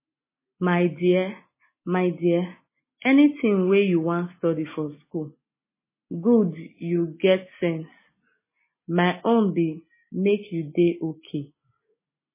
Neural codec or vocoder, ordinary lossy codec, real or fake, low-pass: none; MP3, 16 kbps; real; 3.6 kHz